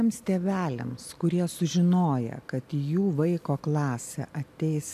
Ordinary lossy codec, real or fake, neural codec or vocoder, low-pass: MP3, 96 kbps; real; none; 14.4 kHz